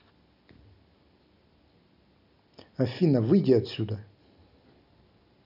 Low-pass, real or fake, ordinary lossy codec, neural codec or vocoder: 5.4 kHz; real; none; none